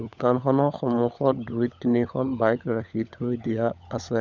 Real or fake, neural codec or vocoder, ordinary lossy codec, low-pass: fake; codec, 16 kHz, 16 kbps, FunCodec, trained on LibriTTS, 50 frames a second; none; none